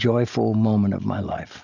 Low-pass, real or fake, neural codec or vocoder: 7.2 kHz; real; none